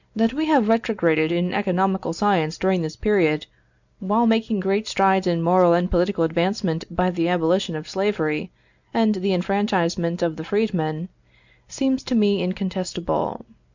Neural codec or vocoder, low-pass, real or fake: none; 7.2 kHz; real